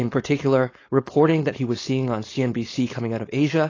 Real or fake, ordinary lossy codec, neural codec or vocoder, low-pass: fake; AAC, 32 kbps; codec, 16 kHz, 4.8 kbps, FACodec; 7.2 kHz